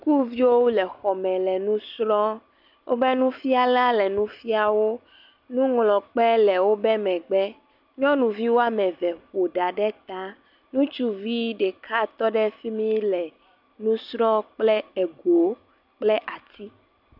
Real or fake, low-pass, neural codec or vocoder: real; 5.4 kHz; none